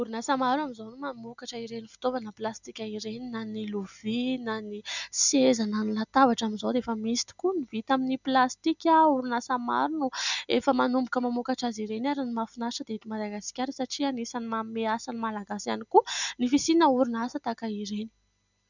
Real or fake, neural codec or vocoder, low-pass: real; none; 7.2 kHz